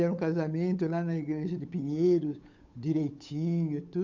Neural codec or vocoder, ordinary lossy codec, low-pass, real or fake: codec, 16 kHz, 8 kbps, FunCodec, trained on Chinese and English, 25 frames a second; MP3, 64 kbps; 7.2 kHz; fake